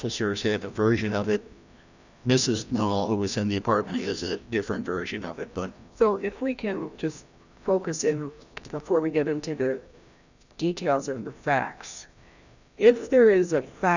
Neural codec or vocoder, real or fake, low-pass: codec, 16 kHz, 1 kbps, FreqCodec, larger model; fake; 7.2 kHz